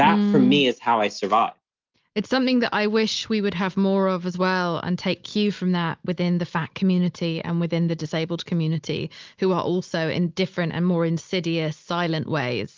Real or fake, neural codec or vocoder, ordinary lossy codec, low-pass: real; none; Opus, 32 kbps; 7.2 kHz